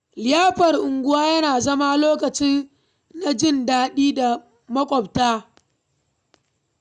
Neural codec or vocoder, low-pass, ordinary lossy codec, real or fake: none; 10.8 kHz; none; real